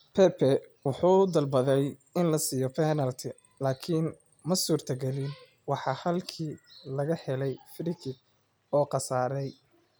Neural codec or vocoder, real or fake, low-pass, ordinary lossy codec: none; real; none; none